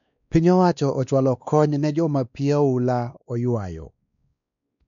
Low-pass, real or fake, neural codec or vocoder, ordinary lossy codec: 7.2 kHz; fake; codec, 16 kHz, 2 kbps, X-Codec, WavLM features, trained on Multilingual LibriSpeech; none